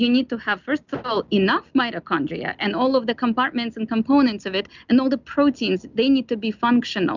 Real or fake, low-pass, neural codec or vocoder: real; 7.2 kHz; none